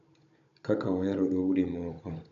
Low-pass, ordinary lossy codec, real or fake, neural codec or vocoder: 7.2 kHz; none; fake; codec, 16 kHz, 16 kbps, FunCodec, trained on Chinese and English, 50 frames a second